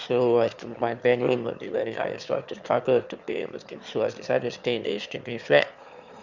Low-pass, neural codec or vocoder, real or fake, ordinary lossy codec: 7.2 kHz; autoencoder, 22.05 kHz, a latent of 192 numbers a frame, VITS, trained on one speaker; fake; Opus, 64 kbps